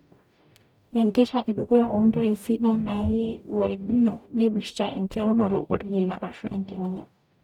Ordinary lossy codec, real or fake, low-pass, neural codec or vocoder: none; fake; 19.8 kHz; codec, 44.1 kHz, 0.9 kbps, DAC